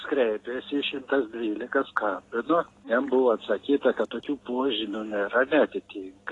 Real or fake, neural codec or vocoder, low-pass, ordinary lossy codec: real; none; 10.8 kHz; AAC, 32 kbps